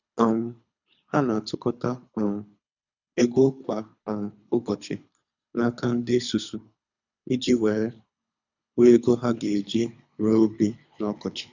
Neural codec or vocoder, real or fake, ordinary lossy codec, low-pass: codec, 24 kHz, 3 kbps, HILCodec; fake; none; 7.2 kHz